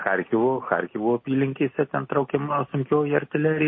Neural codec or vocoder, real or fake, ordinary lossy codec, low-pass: none; real; MP3, 24 kbps; 7.2 kHz